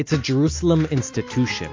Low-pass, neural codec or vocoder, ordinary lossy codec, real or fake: 7.2 kHz; none; MP3, 48 kbps; real